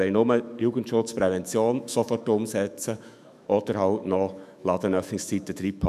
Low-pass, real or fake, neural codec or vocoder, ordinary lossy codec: 14.4 kHz; fake; autoencoder, 48 kHz, 128 numbers a frame, DAC-VAE, trained on Japanese speech; none